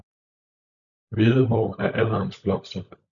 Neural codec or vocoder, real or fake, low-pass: codec, 16 kHz, 16 kbps, FunCodec, trained on LibriTTS, 50 frames a second; fake; 7.2 kHz